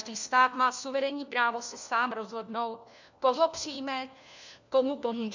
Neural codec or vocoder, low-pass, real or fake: codec, 16 kHz, 1 kbps, FunCodec, trained on LibriTTS, 50 frames a second; 7.2 kHz; fake